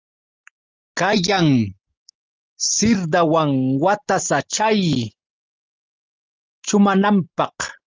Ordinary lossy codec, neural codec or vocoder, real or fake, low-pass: Opus, 32 kbps; none; real; 7.2 kHz